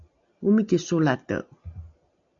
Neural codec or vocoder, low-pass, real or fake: none; 7.2 kHz; real